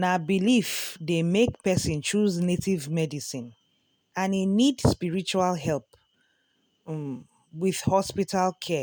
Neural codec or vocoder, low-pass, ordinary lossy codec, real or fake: none; none; none; real